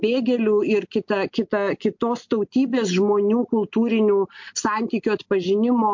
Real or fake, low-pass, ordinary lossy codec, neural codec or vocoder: real; 7.2 kHz; MP3, 48 kbps; none